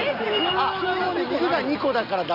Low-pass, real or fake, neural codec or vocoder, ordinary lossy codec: 5.4 kHz; real; none; none